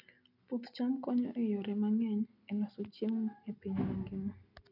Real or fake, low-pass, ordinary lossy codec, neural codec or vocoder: real; 5.4 kHz; none; none